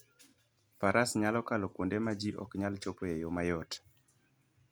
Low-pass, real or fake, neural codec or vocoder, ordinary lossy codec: none; real; none; none